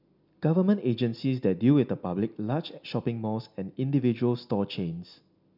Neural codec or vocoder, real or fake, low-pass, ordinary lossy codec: none; real; 5.4 kHz; none